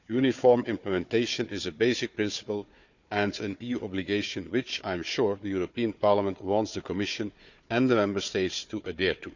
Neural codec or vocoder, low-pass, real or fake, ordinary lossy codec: codec, 16 kHz, 4 kbps, FunCodec, trained on Chinese and English, 50 frames a second; 7.2 kHz; fake; none